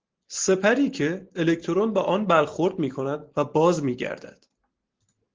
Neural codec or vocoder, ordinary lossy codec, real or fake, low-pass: none; Opus, 16 kbps; real; 7.2 kHz